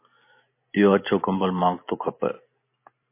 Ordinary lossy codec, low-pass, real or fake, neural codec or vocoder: MP3, 24 kbps; 3.6 kHz; real; none